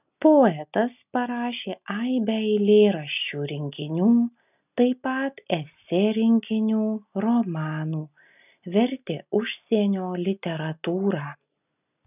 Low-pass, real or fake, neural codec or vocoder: 3.6 kHz; real; none